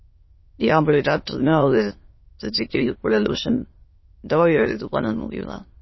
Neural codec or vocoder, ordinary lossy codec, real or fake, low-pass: autoencoder, 22.05 kHz, a latent of 192 numbers a frame, VITS, trained on many speakers; MP3, 24 kbps; fake; 7.2 kHz